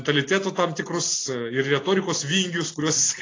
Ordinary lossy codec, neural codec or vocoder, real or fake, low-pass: AAC, 32 kbps; none; real; 7.2 kHz